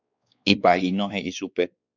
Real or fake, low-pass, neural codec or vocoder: fake; 7.2 kHz; codec, 16 kHz, 4 kbps, X-Codec, WavLM features, trained on Multilingual LibriSpeech